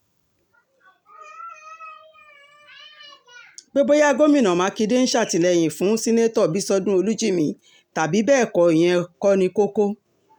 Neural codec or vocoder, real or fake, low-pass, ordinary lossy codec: none; real; 19.8 kHz; none